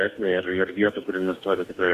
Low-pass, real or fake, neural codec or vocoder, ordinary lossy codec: 14.4 kHz; fake; codec, 44.1 kHz, 2.6 kbps, DAC; Opus, 64 kbps